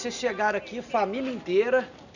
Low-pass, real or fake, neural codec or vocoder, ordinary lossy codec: 7.2 kHz; real; none; none